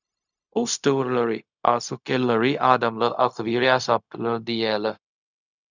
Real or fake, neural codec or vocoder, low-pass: fake; codec, 16 kHz, 0.4 kbps, LongCat-Audio-Codec; 7.2 kHz